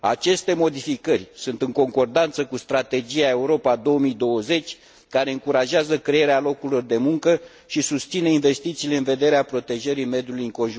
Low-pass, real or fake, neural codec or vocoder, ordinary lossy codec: none; real; none; none